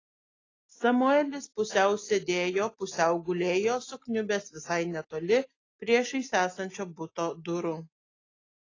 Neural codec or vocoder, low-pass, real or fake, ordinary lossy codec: none; 7.2 kHz; real; AAC, 32 kbps